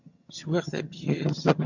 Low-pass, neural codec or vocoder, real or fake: 7.2 kHz; vocoder, 22.05 kHz, 80 mel bands, HiFi-GAN; fake